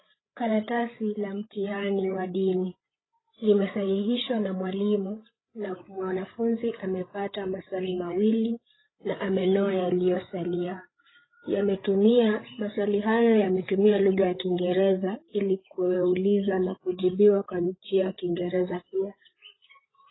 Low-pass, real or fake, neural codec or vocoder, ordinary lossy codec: 7.2 kHz; fake; codec, 16 kHz, 8 kbps, FreqCodec, larger model; AAC, 16 kbps